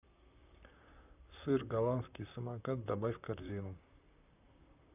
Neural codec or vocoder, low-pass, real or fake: vocoder, 44.1 kHz, 128 mel bands, Pupu-Vocoder; 3.6 kHz; fake